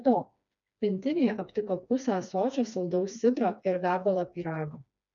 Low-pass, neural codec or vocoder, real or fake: 7.2 kHz; codec, 16 kHz, 2 kbps, FreqCodec, smaller model; fake